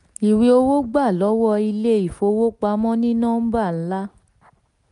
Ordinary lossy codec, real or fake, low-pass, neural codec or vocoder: none; real; 10.8 kHz; none